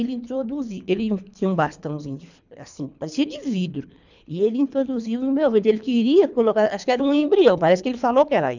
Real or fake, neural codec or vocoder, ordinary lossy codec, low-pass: fake; codec, 24 kHz, 3 kbps, HILCodec; none; 7.2 kHz